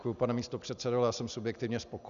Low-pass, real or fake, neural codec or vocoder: 7.2 kHz; real; none